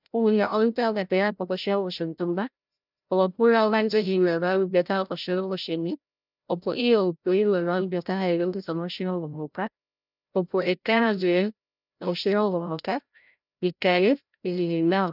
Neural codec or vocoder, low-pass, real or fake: codec, 16 kHz, 0.5 kbps, FreqCodec, larger model; 5.4 kHz; fake